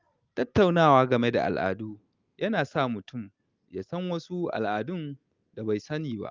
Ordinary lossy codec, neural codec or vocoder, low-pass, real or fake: Opus, 24 kbps; none; 7.2 kHz; real